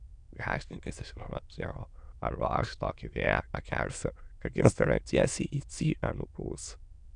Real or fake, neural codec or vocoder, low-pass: fake; autoencoder, 22.05 kHz, a latent of 192 numbers a frame, VITS, trained on many speakers; 9.9 kHz